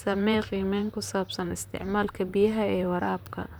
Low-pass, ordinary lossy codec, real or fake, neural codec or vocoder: none; none; fake; vocoder, 44.1 kHz, 128 mel bands, Pupu-Vocoder